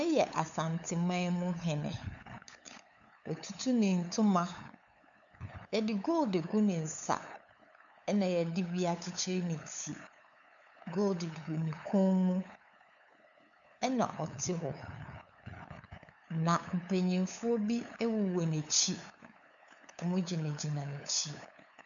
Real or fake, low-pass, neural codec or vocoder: fake; 7.2 kHz; codec, 16 kHz, 8 kbps, FunCodec, trained on LibriTTS, 25 frames a second